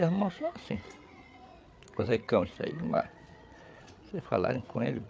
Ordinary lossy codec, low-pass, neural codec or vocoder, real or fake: none; none; codec, 16 kHz, 8 kbps, FreqCodec, larger model; fake